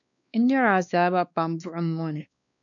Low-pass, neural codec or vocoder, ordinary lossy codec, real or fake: 7.2 kHz; codec, 16 kHz, 2 kbps, X-Codec, WavLM features, trained on Multilingual LibriSpeech; MP3, 96 kbps; fake